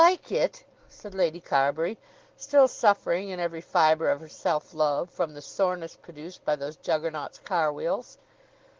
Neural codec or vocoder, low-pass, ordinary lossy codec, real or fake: none; 7.2 kHz; Opus, 16 kbps; real